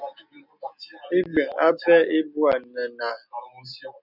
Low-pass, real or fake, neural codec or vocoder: 5.4 kHz; real; none